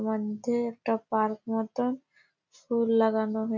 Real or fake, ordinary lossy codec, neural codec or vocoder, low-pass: real; none; none; 7.2 kHz